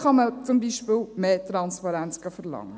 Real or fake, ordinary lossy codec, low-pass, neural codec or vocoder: real; none; none; none